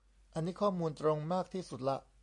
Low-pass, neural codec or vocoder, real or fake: 10.8 kHz; none; real